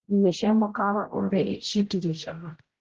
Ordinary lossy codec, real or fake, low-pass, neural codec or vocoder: Opus, 16 kbps; fake; 7.2 kHz; codec, 16 kHz, 0.5 kbps, X-Codec, HuBERT features, trained on general audio